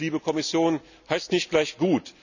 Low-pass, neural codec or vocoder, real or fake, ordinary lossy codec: 7.2 kHz; none; real; none